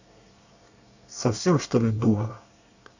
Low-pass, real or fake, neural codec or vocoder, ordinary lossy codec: 7.2 kHz; fake; codec, 24 kHz, 1 kbps, SNAC; none